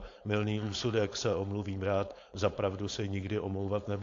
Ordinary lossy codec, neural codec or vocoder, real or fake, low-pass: AAC, 48 kbps; codec, 16 kHz, 4.8 kbps, FACodec; fake; 7.2 kHz